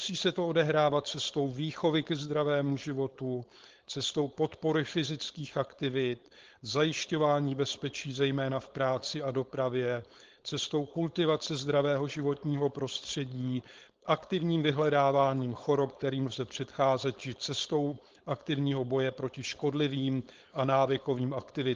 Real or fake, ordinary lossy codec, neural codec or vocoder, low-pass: fake; Opus, 16 kbps; codec, 16 kHz, 4.8 kbps, FACodec; 7.2 kHz